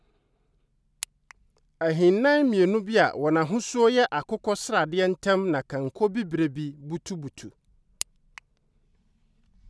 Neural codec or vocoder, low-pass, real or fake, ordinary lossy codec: none; none; real; none